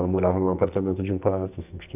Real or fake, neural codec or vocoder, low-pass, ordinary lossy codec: fake; codec, 44.1 kHz, 2.6 kbps, SNAC; 3.6 kHz; none